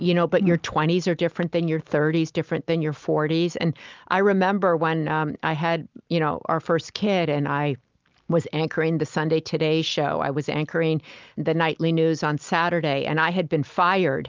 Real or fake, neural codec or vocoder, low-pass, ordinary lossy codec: real; none; 7.2 kHz; Opus, 24 kbps